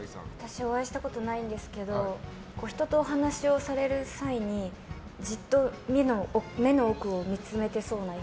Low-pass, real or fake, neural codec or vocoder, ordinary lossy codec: none; real; none; none